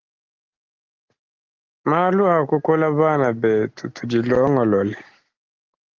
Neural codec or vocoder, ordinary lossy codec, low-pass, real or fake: none; Opus, 16 kbps; 7.2 kHz; real